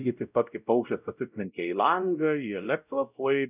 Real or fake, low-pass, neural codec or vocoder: fake; 3.6 kHz; codec, 16 kHz, 0.5 kbps, X-Codec, WavLM features, trained on Multilingual LibriSpeech